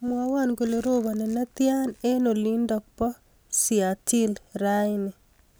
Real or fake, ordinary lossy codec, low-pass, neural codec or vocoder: real; none; none; none